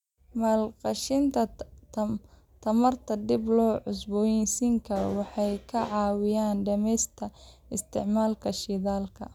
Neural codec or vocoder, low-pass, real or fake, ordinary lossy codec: none; 19.8 kHz; real; none